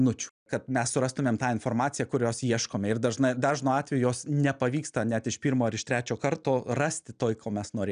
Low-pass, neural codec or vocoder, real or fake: 9.9 kHz; none; real